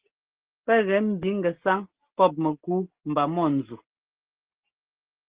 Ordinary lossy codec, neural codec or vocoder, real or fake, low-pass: Opus, 16 kbps; none; real; 3.6 kHz